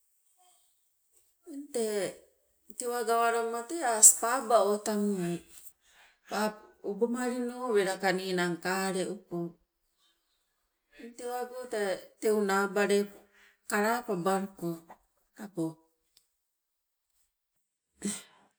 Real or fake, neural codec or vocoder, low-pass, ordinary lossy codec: real; none; none; none